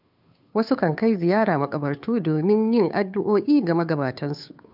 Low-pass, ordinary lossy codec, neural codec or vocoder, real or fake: 5.4 kHz; none; codec, 16 kHz, 2 kbps, FunCodec, trained on Chinese and English, 25 frames a second; fake